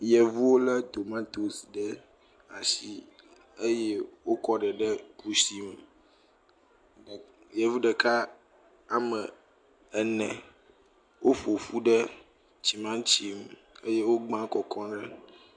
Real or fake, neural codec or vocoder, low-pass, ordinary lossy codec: real; none; 9.9 kHz; MP3, 64 kbps